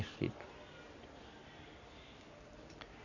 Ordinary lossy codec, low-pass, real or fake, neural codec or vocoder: none; 7.2 kHz; real; none